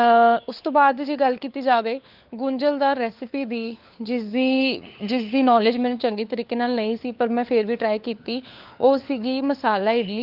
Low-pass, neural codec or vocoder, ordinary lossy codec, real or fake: 5.4 kHz; codec, 16 kHz, 4 kbps, FunCodec, trained on LibriTTS, 50 frames a second; Opus, 32 kbps; fake